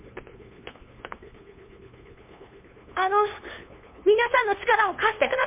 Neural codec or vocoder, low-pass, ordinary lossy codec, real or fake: codec, 16 kHz, 2 kbps, FunCodec, trained on LibriTTS, 25 frames a second; 3.6 kHz; MP3, 24 kbps; fake